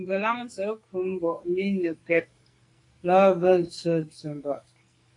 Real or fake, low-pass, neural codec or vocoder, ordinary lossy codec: fake; 10.8 kHz; codec, 44.1 kHz, 2.6 kbps, SNAC; AAC, 48 kbps